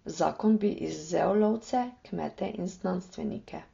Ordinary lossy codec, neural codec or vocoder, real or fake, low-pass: AAC, 32 kbps; none; real; 7.2 kHz